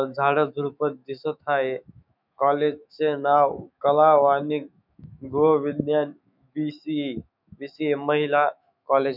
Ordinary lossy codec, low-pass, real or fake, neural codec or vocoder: none; 5.4 kHz; fake; autoencoder, 48 kHz, 128 numbers a frame, DAC-VAE, trained on Japanese speech